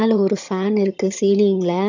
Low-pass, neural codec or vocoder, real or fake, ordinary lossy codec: 7.2 kHz; vocoder, 22.05 kHz, 80 mel bands, HiFi-GAN; fake; none